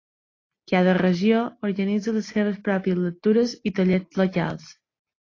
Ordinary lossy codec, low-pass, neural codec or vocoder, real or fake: AAC, 32 kbps; 7.2 kHz; none; real